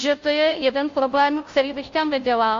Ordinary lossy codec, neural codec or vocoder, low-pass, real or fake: AAC, 48 kbps; codec, 16 kHz, 0.5 kbps, FunCodec, trained on Chinese and English, 25 frames a second; 7.2 kHz; fake